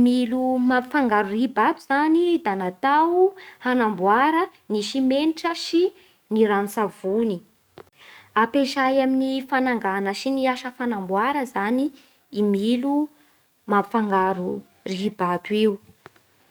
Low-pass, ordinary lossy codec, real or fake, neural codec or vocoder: none; none; fake; codec, 44.1 kHz, 7.8 kbps, DAC